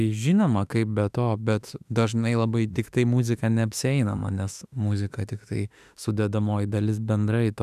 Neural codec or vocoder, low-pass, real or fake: autoencoder, 48 kHz, 32 numbers a frame, DAC-VAE, trained on Japanese speech; 14.4 kHz; fake